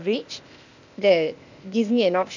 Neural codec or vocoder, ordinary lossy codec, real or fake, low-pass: codec, 16 kHz, 0.8 kbps, ZipCodec; none; fake; 7.2 kHz